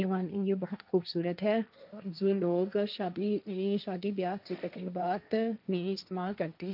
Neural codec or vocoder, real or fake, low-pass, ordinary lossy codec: codec, 16 kHz, 1.1 kbps, Voila-Tokenizer; fake; 5.4 kHz; none